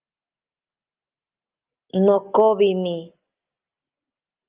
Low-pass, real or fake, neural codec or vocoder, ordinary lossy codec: 3.6 kHz; real; none; Opus, 32 kbps